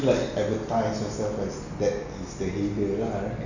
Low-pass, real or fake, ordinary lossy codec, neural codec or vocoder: 7.2 kHz; real; none; none